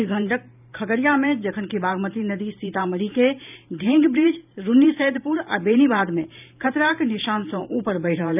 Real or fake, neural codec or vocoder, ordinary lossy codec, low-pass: fake; vocoder, 44.1 kHz, 128 mel bands every 512 samples, BigVGAN v2; none; 3.6 kHz